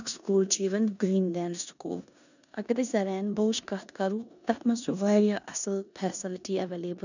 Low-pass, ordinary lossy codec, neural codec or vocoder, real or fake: 7.2 kHz; none; codec, 16 kHz in and 24 kHz out, 0.9 kbps, LongCat-Audio-Codec, four codebook decoder; fake